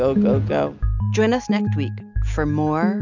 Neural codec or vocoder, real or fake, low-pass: none; real; 7.2 kHz